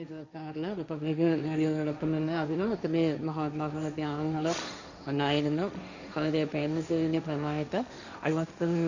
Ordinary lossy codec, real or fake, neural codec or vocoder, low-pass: none; fake; codec, 16 kHz, 1.1 kbps, Voila-Tokenizer; 7.2 kHz